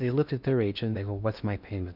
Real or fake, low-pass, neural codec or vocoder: fake; 5.4 kHz; codec, 16 kHz in and 24 kHz out, 0.8 kbps, FocalCodec, streaming, 65536 codes